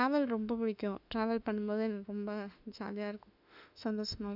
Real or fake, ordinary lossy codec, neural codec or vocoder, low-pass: fake; none; autoencoder, 48 kHz, 32 numbers a frame, DAC-VAE, trained on Japanese speech; 5.4 kHz